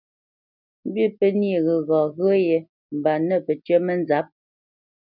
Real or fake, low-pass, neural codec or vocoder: real; 5.4 kHz; none